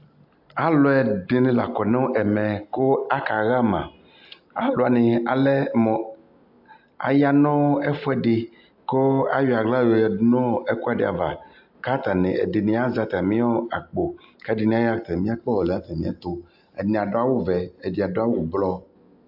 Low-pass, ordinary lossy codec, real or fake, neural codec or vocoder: 5.4 kHz; AAC, 48 kbps; real; none